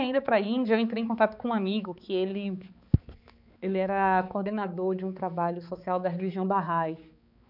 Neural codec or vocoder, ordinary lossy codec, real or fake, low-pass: codec, 16 kHz, 4 kbps, X-Codec, HuBERT features, trained on balanced general audio; none; fake; 5.4 kHz